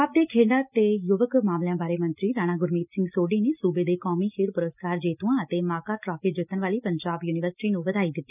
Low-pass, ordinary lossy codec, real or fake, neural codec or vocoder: 3.6 kHz; none; real; none